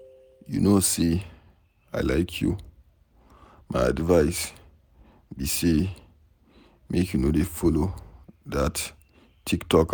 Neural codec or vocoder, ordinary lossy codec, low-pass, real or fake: none; none; none; real